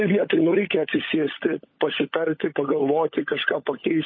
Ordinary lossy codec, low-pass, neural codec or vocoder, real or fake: MP3, 24 kbps; 7.2 kHz; codec, 16 kHz, 16 kbps, FunCodec, trained on LibriTTS, 50 frames a second; fake